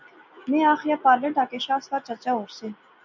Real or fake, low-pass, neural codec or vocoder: real; 7.2 kHz; none